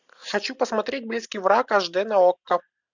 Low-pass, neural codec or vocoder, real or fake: 7.2 kHz; none; real